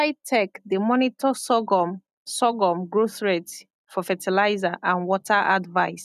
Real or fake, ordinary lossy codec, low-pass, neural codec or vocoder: real; none; 14.4 kHz; none